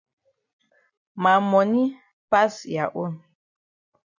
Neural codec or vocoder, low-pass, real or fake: none; 7.2 kHz; real